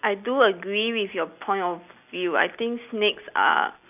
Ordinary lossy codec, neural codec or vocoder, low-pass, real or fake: none; none; 3.6 kHz; real